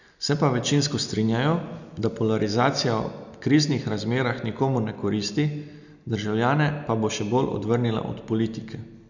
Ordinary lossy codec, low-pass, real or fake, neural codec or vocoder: none; 7.2 kHz; real; none